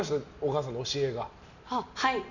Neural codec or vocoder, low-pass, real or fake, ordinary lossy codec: none; 7.2 kHz; real; none